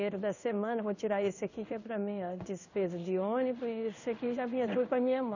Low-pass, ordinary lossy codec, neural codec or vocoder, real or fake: 7.2 kHz; none; codec, 16 kHz in and 24 kHz out, 1 kbps, XY-Tokenizer; fake